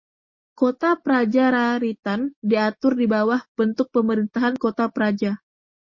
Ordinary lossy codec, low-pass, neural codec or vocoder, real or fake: MP3, 32 kbps; 7.2 kHz; none; real